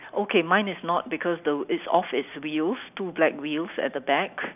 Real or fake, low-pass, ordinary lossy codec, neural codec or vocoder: real; 3.6 kHz; none; none